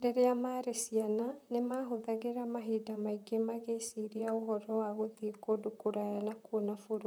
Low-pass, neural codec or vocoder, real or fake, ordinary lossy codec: none; vocoder, 44.1 kHz, 128 mel bands, Pupu-Vocoder; fake; none